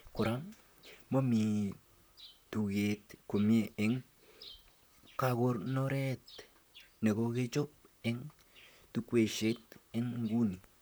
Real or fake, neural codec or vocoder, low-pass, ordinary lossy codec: fake; vocoder, 44.1 kHz, 128 mel bands, Pupu-Vocoder; none; none